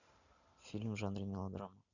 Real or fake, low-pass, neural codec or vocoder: real; 7.2 kHz; none